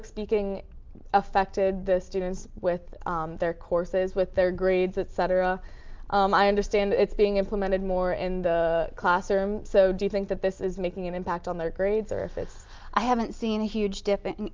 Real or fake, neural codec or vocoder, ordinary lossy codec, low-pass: real; none; Opus, 32 kbps; 7.2 kHz